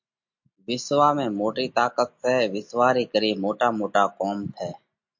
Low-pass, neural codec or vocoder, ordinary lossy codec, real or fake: 7.2 kHz; none; MP3, 48 kbps; real